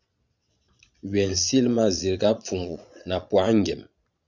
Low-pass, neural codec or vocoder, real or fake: 7.2 kHz; vocoder, 22.05 kHz, 80 mel bands, Vocos; fake